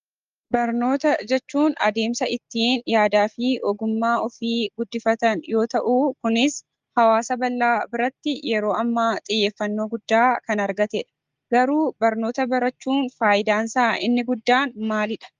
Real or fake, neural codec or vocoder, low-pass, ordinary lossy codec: real; none; 7.2 kHz; Opus, 32 kbps